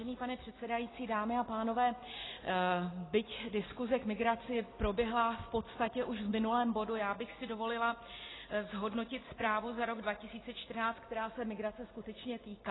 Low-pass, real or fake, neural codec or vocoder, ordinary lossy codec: 7.2 kHz; real; none; AAC, 16 kbps